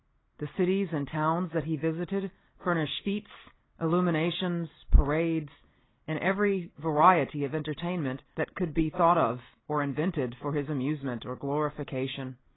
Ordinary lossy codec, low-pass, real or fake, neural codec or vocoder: AAC, 16 kbps; 7.2 kHz; real; none